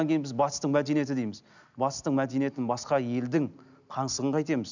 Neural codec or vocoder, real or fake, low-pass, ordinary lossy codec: none; real; 7.2 kHz; none